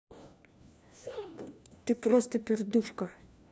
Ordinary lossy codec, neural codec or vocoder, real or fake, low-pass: none; codec, 16 kHz, 1 kbps, FunCodec, trained on LibriTTS, 50 frames a second; fake; none